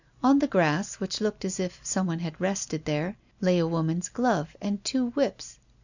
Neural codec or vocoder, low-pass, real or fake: none; 7.2 kHz; real